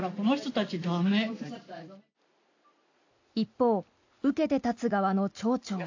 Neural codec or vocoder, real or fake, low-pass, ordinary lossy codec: none; real; 7.2 kHz; AAC, 48 kbps